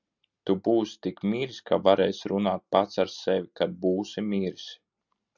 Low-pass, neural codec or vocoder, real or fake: 7.2 kHz; none; real